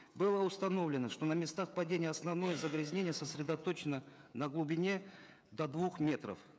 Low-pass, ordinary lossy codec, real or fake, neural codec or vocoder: none; none; fake; codec, 16 kHz, 16 kbps, FreqCodec, smaller model